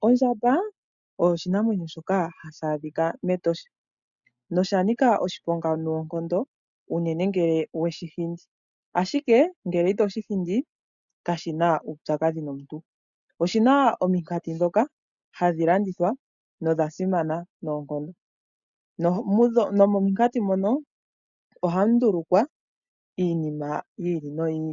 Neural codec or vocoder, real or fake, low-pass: none; real; 7.2 kHz